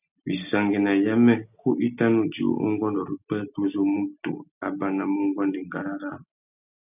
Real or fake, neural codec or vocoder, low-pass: real; none; 3.6 kHz